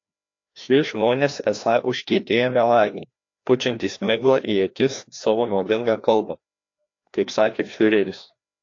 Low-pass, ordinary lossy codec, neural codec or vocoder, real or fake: 7.2 kHz; AAC, 48 kbps; codec, 16 kHz, 1 kbps, FreqCodec, larger model; fake